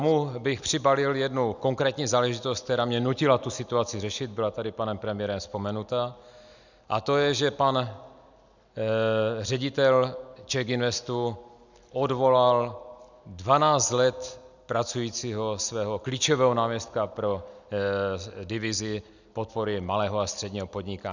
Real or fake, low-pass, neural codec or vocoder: real; 7.2 kHz; none